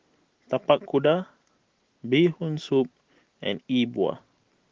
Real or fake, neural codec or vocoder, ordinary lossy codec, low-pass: real; none; Opus, 16 kbps; 7.2 kHz